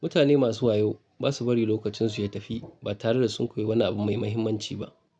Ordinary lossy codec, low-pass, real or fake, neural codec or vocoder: none; 9.9 kHz; real; none